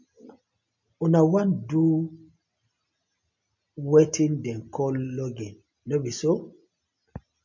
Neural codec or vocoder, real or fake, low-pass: none; real; 7.2 kHz